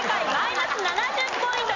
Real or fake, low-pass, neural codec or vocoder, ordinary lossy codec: real; 7.2 kHz; none; MP3, 64 kbps